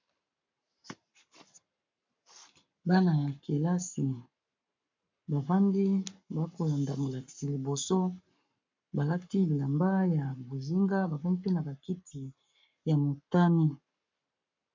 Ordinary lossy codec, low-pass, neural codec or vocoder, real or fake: MP3, 64 kbps; 7.2 kHz; codec, 44.1 kHz, 7.8 kbps, Pupu-Codec; fake